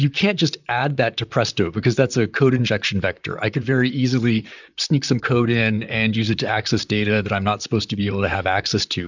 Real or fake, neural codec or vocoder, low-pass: fake; vocoder, 44.1 kHz, 128 mel bands, Pupu-Vocoder; 7.2 kHz